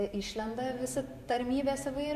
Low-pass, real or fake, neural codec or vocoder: 14.4 kHz; fake; vocoder, 44.1 kHz, 128 mel bands every 512 samples, BigVGAN v2